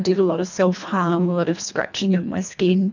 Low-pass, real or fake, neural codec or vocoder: 7.2 kHz; fake; codec, 24 kHz, 1.5 kbps, HILCodec